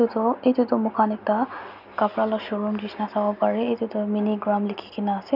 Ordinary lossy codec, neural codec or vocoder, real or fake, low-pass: none; none; real; 5.4 kHz